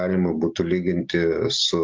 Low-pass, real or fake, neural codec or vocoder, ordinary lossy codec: 7.2 kHz; real; none; Opus, 32 kbps